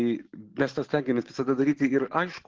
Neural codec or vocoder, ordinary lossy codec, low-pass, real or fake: none; Opus, 16 kbps; 7.2 kHz; real